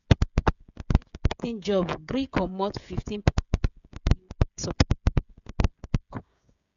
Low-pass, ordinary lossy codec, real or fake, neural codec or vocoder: 7.2 kHz; none; fake; codec, 16 kHz, 16 kbps, FreqCodec, smaller model